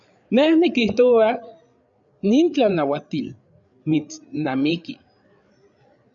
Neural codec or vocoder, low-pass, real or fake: codec, 16 kHz, 8 kbps, FreqCodec, larger model; 7.2 kHz; fake